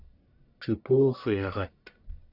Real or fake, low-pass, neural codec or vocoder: fake; 5.4 kHz; codec, 44.1 kHz, 1.7 kbps, Pupu-Codec